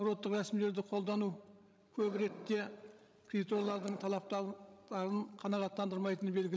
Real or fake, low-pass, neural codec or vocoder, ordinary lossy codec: fake; none; codec, 16 kHz, 16 kbps, FreqCodec, larger model; none